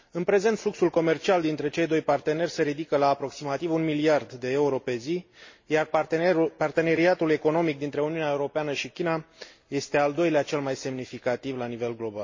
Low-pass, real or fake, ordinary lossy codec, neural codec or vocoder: 7.2 kHz; real; MP3, 32 kbps; none